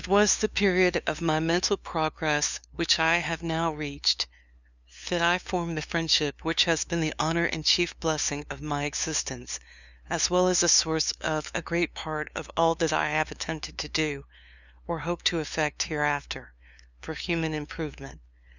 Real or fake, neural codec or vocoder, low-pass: fake; codec, 16 kHz, 2 kbps, FunCodec, trained on LibriTTS, 25 frames a second; 7.2 kHz